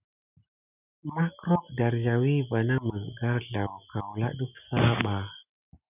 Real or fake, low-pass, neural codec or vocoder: real; 3.6 kHz; none